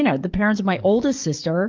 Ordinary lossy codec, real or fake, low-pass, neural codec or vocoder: Opus, 24 kbps; fake; 7.2 kHz; codec, 44.1 kHz, 7.8 kbps, DAC